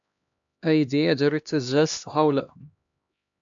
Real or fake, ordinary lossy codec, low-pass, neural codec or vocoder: fake; AAC, 64 kbps; 7.2 kHz; codec, 16 kHz, 1 kbps, X-Codec, HuBERT features, trained on LibriSpeech